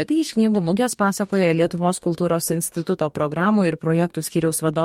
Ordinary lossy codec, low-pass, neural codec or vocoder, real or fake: MP3, 64 kbps; 19.8 kHz; codec, 44.1 kHz, 2.6 kbps, DAC; fake